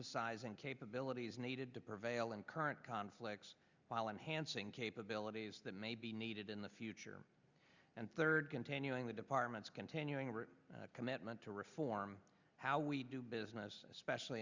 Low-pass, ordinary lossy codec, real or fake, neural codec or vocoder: 7.2 kHz; Opus, 64 kbps; real; none